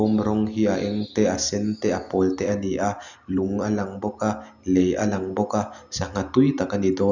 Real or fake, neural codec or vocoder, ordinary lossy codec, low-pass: real; none; none; 7.2 kHz